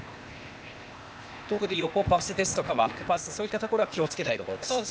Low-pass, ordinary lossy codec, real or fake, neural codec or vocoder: none; none; fake; codec, 16 kHz, 0.8 kbps, ZipCodec